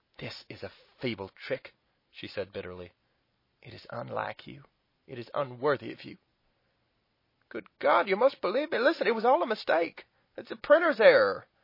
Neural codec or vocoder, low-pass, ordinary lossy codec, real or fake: none; 5.4 kHz; MP3, 24 kbps; real